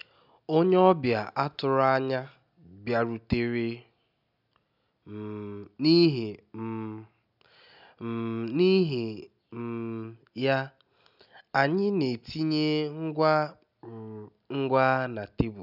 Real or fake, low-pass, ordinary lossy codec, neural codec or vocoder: real; 5.4 kHz; none; none